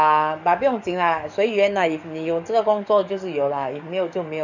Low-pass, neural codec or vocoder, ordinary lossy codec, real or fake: 7.2 kHz; codec, 44.1 kHz, 7.8 kbps, DAC; none; fake